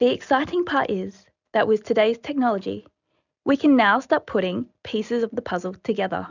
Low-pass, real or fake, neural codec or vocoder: 7.2 kHz; real; none